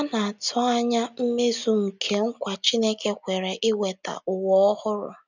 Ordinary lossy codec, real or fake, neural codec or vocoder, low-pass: none; real; none; 7.2 kHz